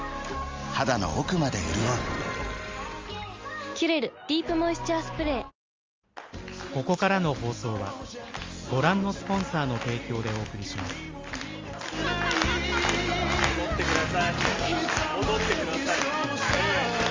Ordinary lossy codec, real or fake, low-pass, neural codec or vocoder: Opus, 32 kbps; real; 7.2 kHz; none